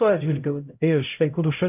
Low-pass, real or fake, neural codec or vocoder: 3.6 kHz; fake; codec, 16 kHz, 0.5 kbps, X-Codec, HuBERT features, trained on LibriSpeech